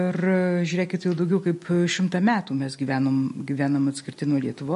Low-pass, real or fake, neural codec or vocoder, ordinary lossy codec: 14.4 kHz; real; none; MP3, 48 kbps